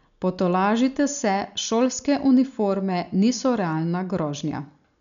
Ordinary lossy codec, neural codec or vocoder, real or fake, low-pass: none; none; real; 7.2 kHz